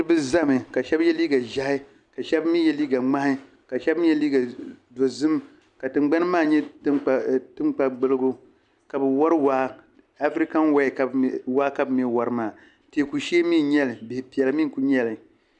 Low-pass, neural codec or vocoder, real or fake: 10.8 kHz; none; real